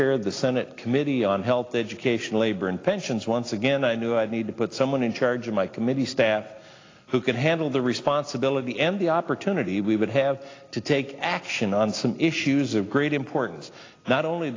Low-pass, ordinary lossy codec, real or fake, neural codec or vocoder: 7.2 kHz; AAC, 32 kbps; real; none